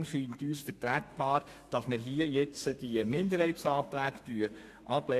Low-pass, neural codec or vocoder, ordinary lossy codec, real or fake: 14.4 kHz; codec, 32 kHz, 1.9 kbps, SNAC; AAC, 64 kbps; fake